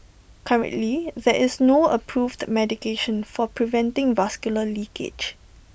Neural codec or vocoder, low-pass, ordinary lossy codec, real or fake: none; none; none; real